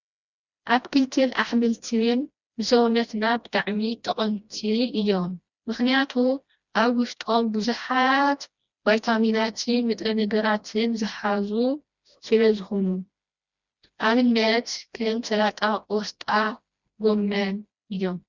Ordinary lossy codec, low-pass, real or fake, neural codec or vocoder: Opus, 64 kbps; 7.2 kHz; fake; codec, 16 kHz, 1 kbps, FreqCodec, smaller model